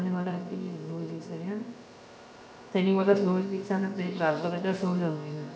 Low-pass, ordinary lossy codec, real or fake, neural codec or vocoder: none; none; fake; codec, 16 kHz, about 1 kbps, DyCAST, with the encoder's durations